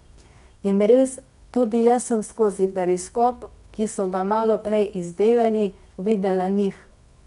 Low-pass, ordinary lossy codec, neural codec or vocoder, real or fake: 10.8 kHz; none; codec, 24 kHz, 0.9 kbps, WavTokenizer, medium music audio release; fake